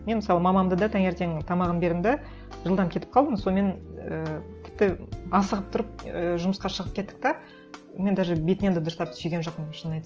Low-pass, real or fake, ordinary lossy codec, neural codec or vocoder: 7.2 kHz; real; Opus, 24 kbps; none